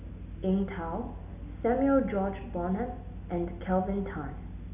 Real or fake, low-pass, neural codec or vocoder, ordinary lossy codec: real; 3.6 kHz; none; none